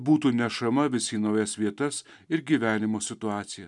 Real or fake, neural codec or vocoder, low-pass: real; none; 10.8 kHz